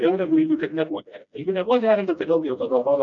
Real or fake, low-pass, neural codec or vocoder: fake; 7.2 kHz; codec, 16 kHz, 1 kbps, FreqCodec, smaller model